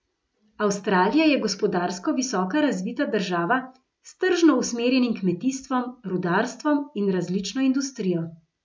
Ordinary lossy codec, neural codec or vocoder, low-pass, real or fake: none; none; none; real